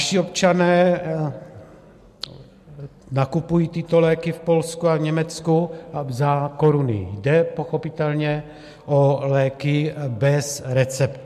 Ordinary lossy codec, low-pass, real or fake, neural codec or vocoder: MP3, 64 kbps; 14.4 kHz; real; none